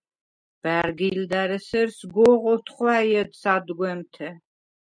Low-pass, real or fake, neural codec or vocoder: 9.9 kHz; real; none